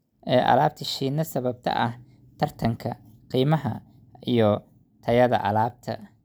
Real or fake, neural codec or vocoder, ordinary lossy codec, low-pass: real; none; none; none